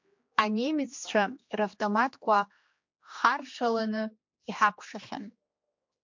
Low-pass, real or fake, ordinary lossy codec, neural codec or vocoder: 7.2 kHz; fake; MP3, 48 kbps; codec, 16 kHz, 2 kbps, X-Codec, HuBERT features, trained on general audio